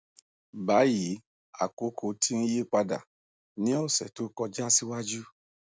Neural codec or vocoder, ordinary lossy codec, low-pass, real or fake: none; none; none; real